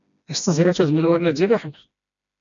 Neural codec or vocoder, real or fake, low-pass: codec, 16 kHz, 1 kbps, FreqCodec, smaller model; fake; 7.2 kHz